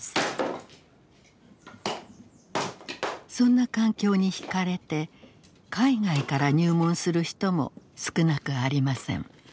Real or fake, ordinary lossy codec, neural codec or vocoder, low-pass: real; none; none; none